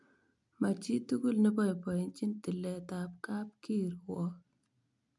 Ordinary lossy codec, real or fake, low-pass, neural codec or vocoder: none; real; 10.8 kHz; none